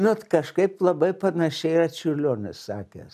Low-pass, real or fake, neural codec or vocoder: 14.4 kHz; real; none